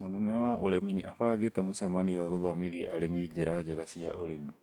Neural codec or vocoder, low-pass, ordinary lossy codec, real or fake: codec, 44.1 kHz, 2.6 kbps, DAC; 19.8 kHz; none; fake